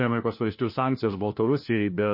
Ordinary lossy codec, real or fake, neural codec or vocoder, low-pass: MP3, 32 kbps; fake; codec, 16 kHz, 1 kbps, FunCodec, trained on LibriTTS, 50 frames a second; 5.4 kHz